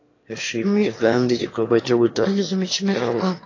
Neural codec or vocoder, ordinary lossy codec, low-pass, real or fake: autoencoder, 22.05 kHz, a latent of 192 numbers a frame, VITS, trained on one speaker; AAC, 32 kbps; 7.2 kHz; fake